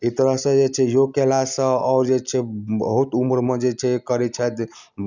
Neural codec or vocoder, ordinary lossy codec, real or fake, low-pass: none; none; real; 7.2 kHz